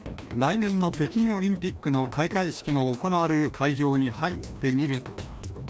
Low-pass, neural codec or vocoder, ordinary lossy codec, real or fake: none; codec, 16 kHz, 1 kbps, FreqCodec, larger model; none; fake